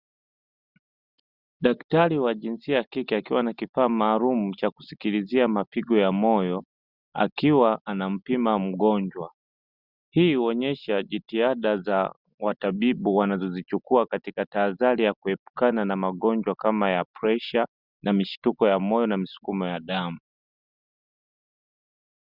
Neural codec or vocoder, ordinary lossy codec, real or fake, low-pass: none; Opus, 64 kbps; real; 5.4 kHz